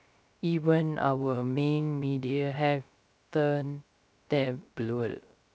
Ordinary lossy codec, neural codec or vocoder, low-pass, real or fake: none; codec, 16 kHz, 0.3 kbps, FocalCodec; none; fake